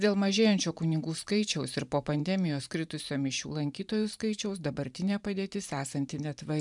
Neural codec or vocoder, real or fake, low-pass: vocoder, 44.1 kHz, 128 mel bands every 512 samples, BigVGAN v2; fake; 10.8 kHz